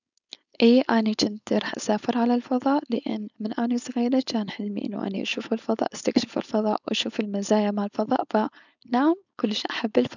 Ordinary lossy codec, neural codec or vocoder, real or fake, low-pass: none; codec, 16 kHz, 4.8 kbps, FACodec; fake; 7.2 kHz